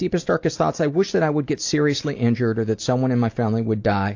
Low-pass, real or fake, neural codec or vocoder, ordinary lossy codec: 7.2 kHz; real; none; AAC, 48 kbps